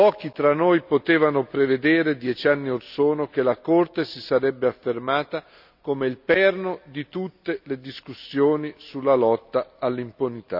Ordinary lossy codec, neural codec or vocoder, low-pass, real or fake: none; none; 5.4 kHz; real